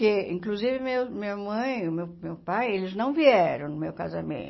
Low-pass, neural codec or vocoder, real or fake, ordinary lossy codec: 7.2 kHz; none; real; MP3, 24 kbps